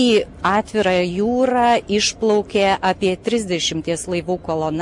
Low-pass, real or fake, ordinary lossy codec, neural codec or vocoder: 10.8 kHz; real; MP3, 48 kbps; none